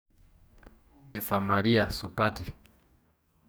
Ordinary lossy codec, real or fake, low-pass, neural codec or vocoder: none; fake; none; codec, 44.1 kHz, 2.6 kbps, DAC